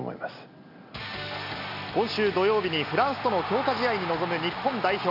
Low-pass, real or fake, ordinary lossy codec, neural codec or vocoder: 5.4 kHz; real; none; none